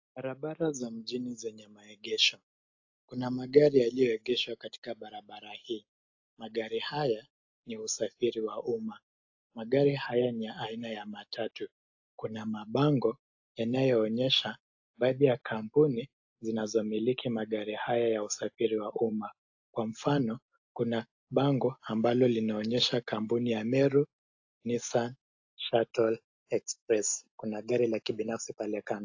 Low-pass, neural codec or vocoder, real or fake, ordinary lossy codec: 7.2 kHz; none; real; AAC, 48 kbps